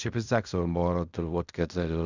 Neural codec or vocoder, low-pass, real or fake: codec, 16 kHz in and 24 kHz out, 0.4 kbps, LongCat-Audio-Codec, fine tuned four codebook decoder; 7.2 kHz; fake